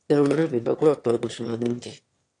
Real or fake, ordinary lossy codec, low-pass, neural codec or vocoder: fake; AAC, 48 kbps; 9.9 kHz; autoencoder, 22.05 kHz, a latent of 192 numbers a frame, VITS, trained on one speaker